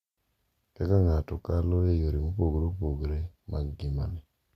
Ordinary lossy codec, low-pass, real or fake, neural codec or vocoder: Opus, 64 kbps; 14.4 kHz; real; none